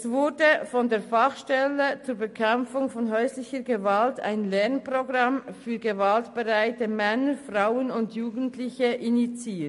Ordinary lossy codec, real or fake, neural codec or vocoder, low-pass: MP3, 48 kbps; real; none; 14.4 kHz